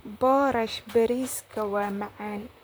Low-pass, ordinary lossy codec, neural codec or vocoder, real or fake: none; none; vocoder, 44.1 kHz, 128 mel bands, Pupu-Vocoder; fake